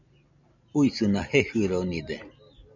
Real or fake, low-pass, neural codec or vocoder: real; 7.2 kHz; none